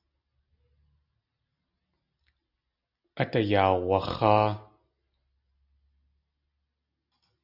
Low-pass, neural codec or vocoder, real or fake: 5.4 kHz; none; real